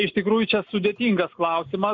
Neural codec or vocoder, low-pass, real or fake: none; 7.2 kHz; real